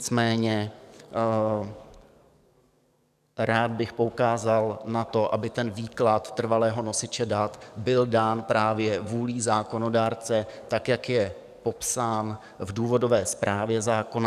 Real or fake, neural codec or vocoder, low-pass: fake; codec, 44.1 kHz, 7.8 kbps, DAC; 14.4 kHz